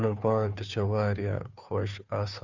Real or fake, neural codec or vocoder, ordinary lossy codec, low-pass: fake; codec, 16 kHz, 2 kbps, FunCodec, trained on Chinese and English, 25 frames a second; none; 7.2 kHz